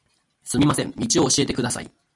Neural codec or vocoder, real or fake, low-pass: none; real; 10.8 kHz